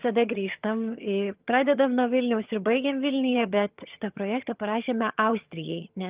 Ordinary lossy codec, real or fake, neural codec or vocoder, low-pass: Opus, 32 kbps; fake; vocoder, 22.05 kHz, 80 mel bands, HiFi-GAN; 3.6 kHz